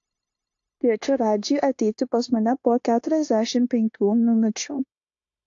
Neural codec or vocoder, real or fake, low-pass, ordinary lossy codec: codec, 16 kHz, 0.9 kbps, LongCat-Audio-Codec; fake; 7.2 kHz; AAC, 48 kbps